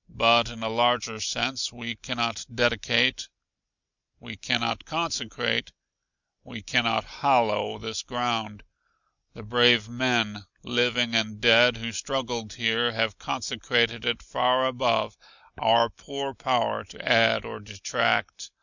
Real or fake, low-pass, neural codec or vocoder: real; 7.2 kHz; none